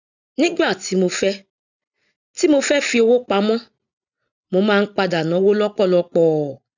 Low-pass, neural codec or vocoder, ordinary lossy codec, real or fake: 7.2 kHz; none; none; real